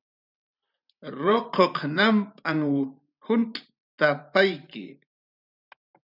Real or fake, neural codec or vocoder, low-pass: fake; vocoder, 44.1 kHz, 128 mel bands every 512 samples, BigVGAN v2; 5.4 kHz